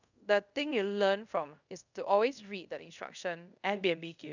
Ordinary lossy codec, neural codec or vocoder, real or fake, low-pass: none; codec, 24 kHz, 0.5 kbps, DualCodec; fake; 7.2 kHz